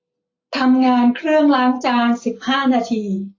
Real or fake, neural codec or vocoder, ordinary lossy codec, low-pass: real; none; AAC, 32 kbps; 7.2 kHz